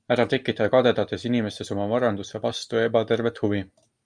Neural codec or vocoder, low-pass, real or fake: none; 9.9 kHz; real